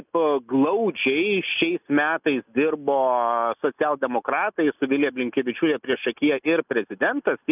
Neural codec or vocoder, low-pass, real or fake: none; 3.6 kHz; real